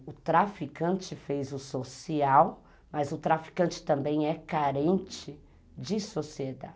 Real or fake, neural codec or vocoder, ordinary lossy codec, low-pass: real; none; none; none